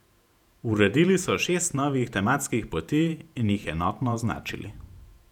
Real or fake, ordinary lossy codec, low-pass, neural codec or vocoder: fake; none; 19.8 kHz; vocoder, 48 kHz, 128 mel bands, Vocos